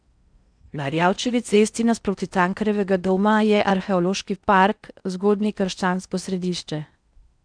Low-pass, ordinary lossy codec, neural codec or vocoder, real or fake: 9.9 kHz; none; codec, 16 kHz in and 24 kHz out, 0.6 kbps, FocalCodec, streaming, 4096 codes; fake